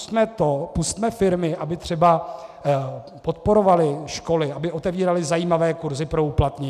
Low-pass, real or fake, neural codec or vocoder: 14.4 kHz; real; none